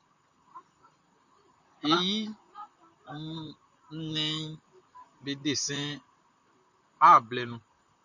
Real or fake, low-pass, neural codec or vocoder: fake; 7.2 kHz; vocoder, 44.1 kHz, 128 mel bands, Pupu-Vocoder